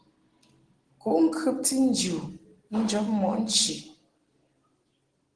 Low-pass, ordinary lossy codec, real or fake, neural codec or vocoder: 9.9 kHz; Opus, 16 kbps; real; none